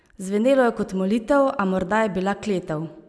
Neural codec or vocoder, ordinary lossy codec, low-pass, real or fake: none; none; none; real